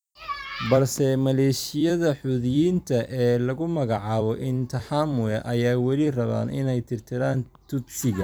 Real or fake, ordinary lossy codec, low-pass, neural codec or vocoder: fake; none; none; vocoder, 44.1 kHz, 128 mel bands every 256 samples, BigVGAN v2